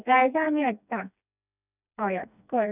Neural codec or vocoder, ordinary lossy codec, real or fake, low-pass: codec, 16 kHz, 2 kbps, FreqCodec, smaller model; none; fake; 3.6 kHz